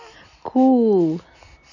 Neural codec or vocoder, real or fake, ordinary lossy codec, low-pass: none; real; none; 7.2 kHz